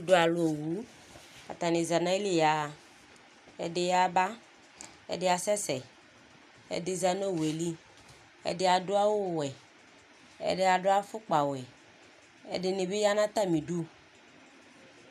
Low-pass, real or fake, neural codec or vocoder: 14.4 kHz; real; none